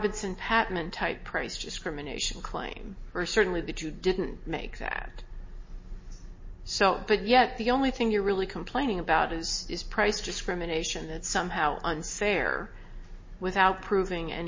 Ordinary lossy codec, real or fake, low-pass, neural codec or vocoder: MP3, 32 kbps; real; 7.2 kHz; none